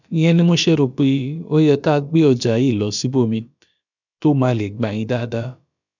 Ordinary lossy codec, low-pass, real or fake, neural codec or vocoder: none; 7.2 kHz; fake; codec, 16 kHz, about 1 kbps, DyCAST, with the encoder's durations